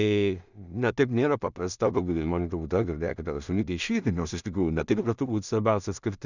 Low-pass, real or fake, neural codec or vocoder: 7.2 kHz; fake; codec, 16 kHz in and 24 kHz out, 0.4 kbps, LongCat-Audio-Codec, two codebook decoder